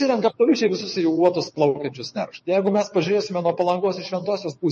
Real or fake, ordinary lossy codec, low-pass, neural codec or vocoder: fake; MP3, 32 kbps; 9.9 kHz; vocoder, 22.05 kHz, 80 mel bands, WaveNeXt